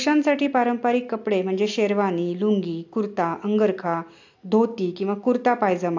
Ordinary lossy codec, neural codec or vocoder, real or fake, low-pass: AAC, 48 kbps; none; real; 7.2 kHz